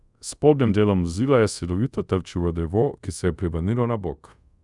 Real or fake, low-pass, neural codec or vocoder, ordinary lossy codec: fake; 10.8 kHz; codec, 24 kHz, 0.5 kbps, DualCodec; none